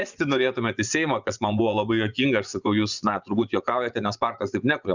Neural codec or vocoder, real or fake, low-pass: vocoder, 24 kHz, 100 mel bands, Vocos; fake; 7.2 kHz